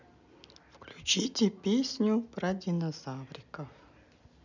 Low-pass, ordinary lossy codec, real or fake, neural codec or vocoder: 7.2 kHz; none; real; none